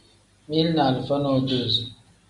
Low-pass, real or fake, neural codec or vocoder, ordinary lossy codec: 10.8 kHz; real; none; MP3, 96 kbps